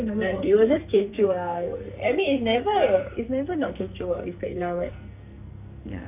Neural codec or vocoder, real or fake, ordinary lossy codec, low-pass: codec, 44.1 kHz, 2.6 kbps, SNAC; fake; none; 3.6 kHz